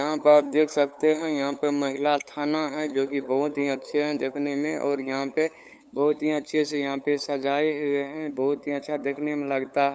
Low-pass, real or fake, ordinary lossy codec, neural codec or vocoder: none; fake; none; codec, 16 kHz, 8 kbps, FunCodec, trained on LibriTTS, 25 frames a second